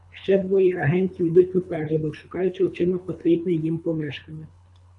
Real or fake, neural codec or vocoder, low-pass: fake; codec, 24 kHz, 3 kbps, HILCodec; 10.8 kHz